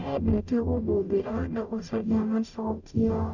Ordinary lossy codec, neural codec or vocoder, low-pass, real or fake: none; codec, 44.1 kHz, 0.9 kbps, DAC; 7.2 kHz; fake